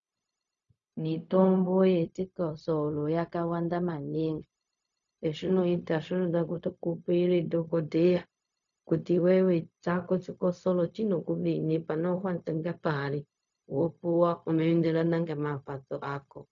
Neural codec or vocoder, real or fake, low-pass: codec, 16 kHz, 0.4 kbps, LongCat-Audio-Codec; fake; 7.2 kHz